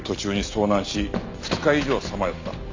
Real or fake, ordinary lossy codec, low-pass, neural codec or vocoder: real; MP3, 64 kbps; 7.2 kHz; none